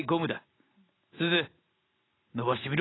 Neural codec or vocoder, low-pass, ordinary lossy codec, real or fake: none; 7.2 kHz; AAC, 16 kbps; real